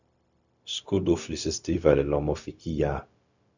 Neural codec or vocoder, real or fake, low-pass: codec, 16 kHz, 0.4 kbps, LongCat-Audio-Codec; fake; 7.2 kHz